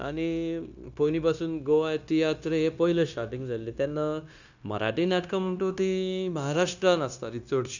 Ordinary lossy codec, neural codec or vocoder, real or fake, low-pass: none; codec, 16 kHz, 0.9 kbps, LongCat-Audio-Codec; fake; 7.2 kHz